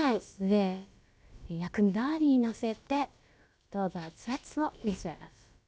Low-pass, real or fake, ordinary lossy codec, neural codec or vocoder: none; fake; none; codec, 16 kHz, about 1 kbps, DyCAST, with the encoder's durations